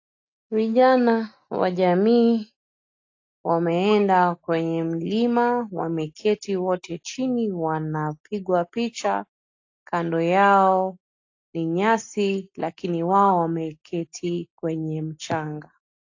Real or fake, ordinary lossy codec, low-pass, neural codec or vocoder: real; AAC, 48 kbps; 7.2 kHz; none